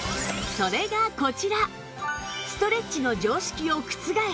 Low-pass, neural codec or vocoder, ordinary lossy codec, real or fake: none; none; none; real